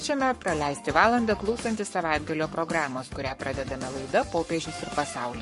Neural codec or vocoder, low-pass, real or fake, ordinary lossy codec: codec, 44.1 kHz, 7.8 kbps, Pupu-Codec; 14.4 kHz; fake; MP3, 48 kbps